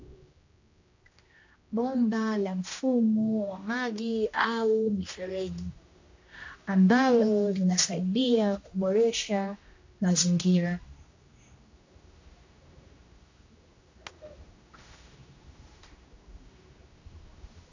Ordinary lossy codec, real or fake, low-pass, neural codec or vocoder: AAC, 48 kbps; fake; 7.2 kHz; codec, 16 kHz, 1 kbps, X-Codec, HuBERT features, trained on general audio